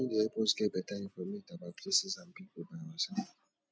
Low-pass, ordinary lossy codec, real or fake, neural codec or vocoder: none; none; real; none